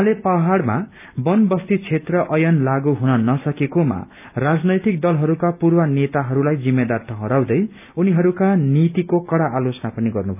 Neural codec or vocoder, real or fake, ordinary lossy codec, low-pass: none; real; none; 3.6 kHz